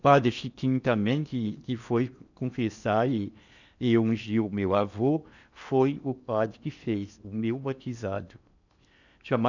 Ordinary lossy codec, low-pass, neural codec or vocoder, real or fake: none; 7.2 kHz; codec, 16 kHz in and 24 kHz out, 0.8 kbps, FocalCodec, streaming, 65536 codes; fake